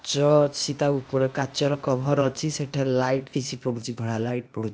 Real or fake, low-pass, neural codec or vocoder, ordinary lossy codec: fake; none; codec, 16 kHz, 0.8 kbps, ZipCodec; none